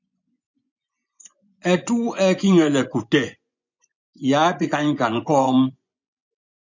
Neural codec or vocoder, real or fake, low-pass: vocoder, 24 kHz, 100 mel bands, Vocos; fake; 7.2 kHz